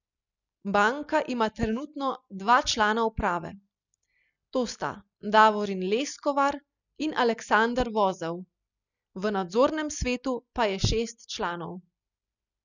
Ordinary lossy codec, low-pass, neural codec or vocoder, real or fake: none; 7.2 kHz; none; real